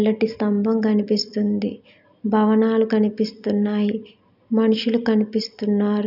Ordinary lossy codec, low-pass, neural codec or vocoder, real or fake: none; 5.4 kHz; none; real